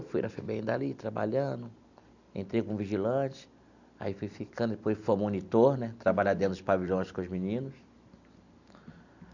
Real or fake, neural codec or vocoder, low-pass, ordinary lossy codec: real; none; 7.2 kHz; none